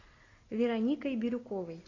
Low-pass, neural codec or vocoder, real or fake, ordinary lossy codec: 7.2 kHz; none; real; AAC, 32 kbps